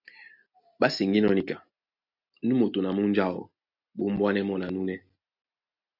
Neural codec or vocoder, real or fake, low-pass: none; real; 5.4 kHz